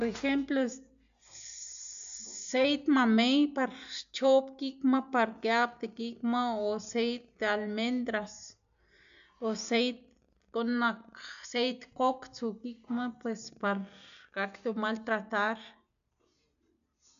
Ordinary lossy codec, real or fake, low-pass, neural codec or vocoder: MP3, 96 kbps; real; 7.2 kHz; none